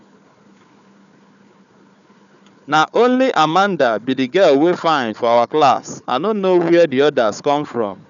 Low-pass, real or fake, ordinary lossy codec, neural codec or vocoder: 7.2 kHz; fake; none; codec, 16 kHz, 4 kbps, FunCodec, trained on Chinese and English, 50 frames a second